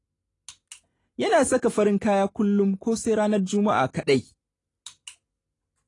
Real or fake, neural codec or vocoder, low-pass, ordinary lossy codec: real; none; 10.8 kHz; AAC, 32 kbps